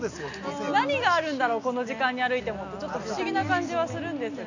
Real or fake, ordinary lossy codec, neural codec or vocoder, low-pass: real; none; none; 7.2 kHz